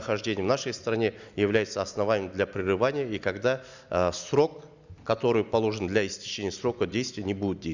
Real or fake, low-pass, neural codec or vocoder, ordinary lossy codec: real; 7.2 kHz; none; Opus, 64 kbps